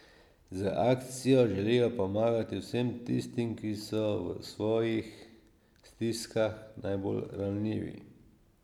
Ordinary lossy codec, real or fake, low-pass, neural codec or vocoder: none; real; 19.8 kHz; none